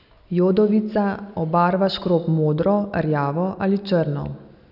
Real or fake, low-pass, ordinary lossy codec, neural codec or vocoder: real; 5.4 kHz; none; none